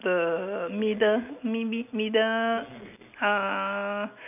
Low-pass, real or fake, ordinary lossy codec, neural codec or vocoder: 3.6 kHz; real; none; none